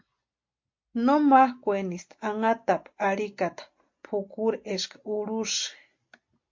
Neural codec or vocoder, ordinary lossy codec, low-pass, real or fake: none; MP3, 48 kbps; 7.2 kHz; real